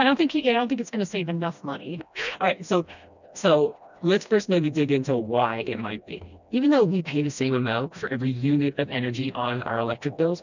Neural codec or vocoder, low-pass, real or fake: codec, 16 kHz, 1 kbps, FreqCodec, smaller model; 7.2 kHz; fake